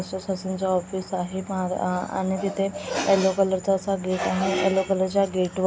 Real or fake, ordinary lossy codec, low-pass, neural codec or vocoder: real; none; none; none